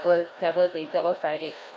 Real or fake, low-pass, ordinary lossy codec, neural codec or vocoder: fake; none; none; codec, 16 kHz, 1 kbps, FreqCodec, larger model